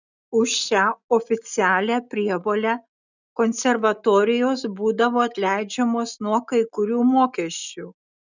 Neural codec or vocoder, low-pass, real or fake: none; 7.2 kHz; real